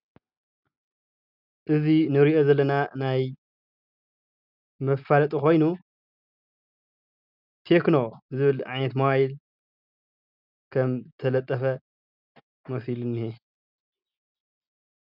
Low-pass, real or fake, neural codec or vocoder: 5.4 kHz; real; none